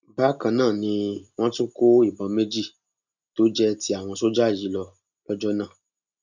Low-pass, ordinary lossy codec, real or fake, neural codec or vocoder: 7.2 kHz; none; real; none